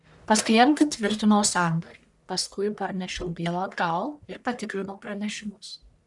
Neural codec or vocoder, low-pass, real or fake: codec, 44.1 kHz, 1.7 kbps, Pupu-Codec; 10.8 kHz; fake